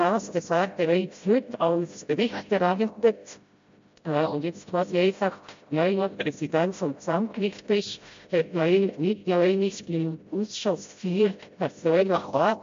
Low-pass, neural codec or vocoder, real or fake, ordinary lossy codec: 7.2 kHz; codec, 16 kHz, 0.5 kbps, FreqCodec, smaller model; fake; MP3, 48 kbps